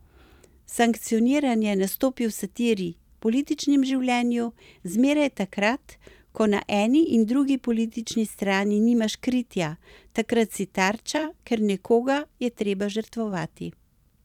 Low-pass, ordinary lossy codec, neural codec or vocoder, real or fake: 19.8 kHz; none; vocoder, 44.1 kHz, 128 mel bands every 256 samples, BigVGAN v2; fake